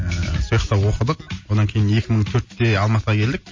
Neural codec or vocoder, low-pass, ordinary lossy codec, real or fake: none; 7.2 kHz; MP3, 32 kbps; real